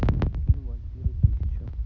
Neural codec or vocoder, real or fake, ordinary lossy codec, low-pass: none; real; none; 7.2 kHz